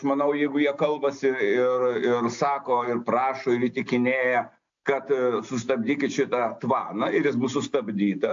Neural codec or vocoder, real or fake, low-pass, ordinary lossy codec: none; real; 7.2 kHz; AAC, 64 kbps